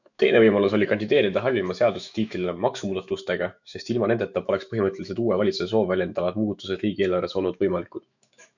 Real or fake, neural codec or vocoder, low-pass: fake; autoencoder, 48 kHz, 128 numbers a frame, DAC-VAE, trained on Japanese speech; 7.2 kHz